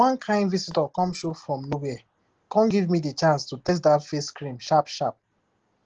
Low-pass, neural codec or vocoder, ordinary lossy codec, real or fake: 7.2 kHz; none; Opus, 16 kbps; real